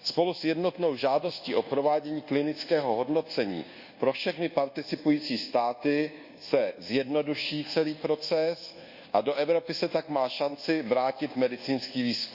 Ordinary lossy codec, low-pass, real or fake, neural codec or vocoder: Opus, 64 kbps; 5.4 kHz; fake; codec, 24 kHz, 1.2 kbps, DualCodec